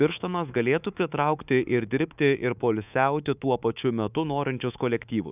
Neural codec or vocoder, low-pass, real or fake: codec, 24 kHz, 3.1 kbps, DualCodec; 3.6 kHz; fake